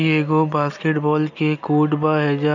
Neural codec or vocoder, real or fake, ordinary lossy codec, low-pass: none; real; none; 7.2 kHz